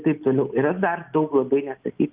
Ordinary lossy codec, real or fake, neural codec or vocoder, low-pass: Opus, 32 kbps; real; none; 3.6 kHz